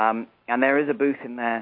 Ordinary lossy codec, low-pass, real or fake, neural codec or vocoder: MP3, 32 kbps; 5.4 kHz; real; none